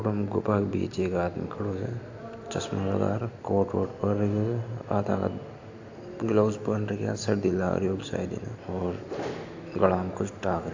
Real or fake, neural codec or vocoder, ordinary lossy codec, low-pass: real; none; AAC, 48 kbps; 7.2 kHz